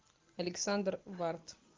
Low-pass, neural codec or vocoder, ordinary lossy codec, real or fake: 7.2 kHz; none; Opus, 24 kbps; real